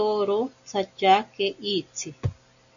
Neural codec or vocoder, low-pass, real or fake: none; 7.2 kHz; real